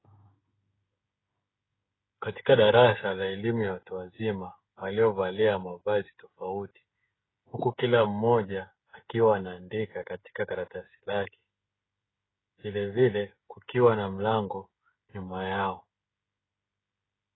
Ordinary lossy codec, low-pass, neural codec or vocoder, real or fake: AAC, 16 kbps; 7.2 kHz; codec, 16 kHz, 16 kbps, FreqCodec, smaller model; fake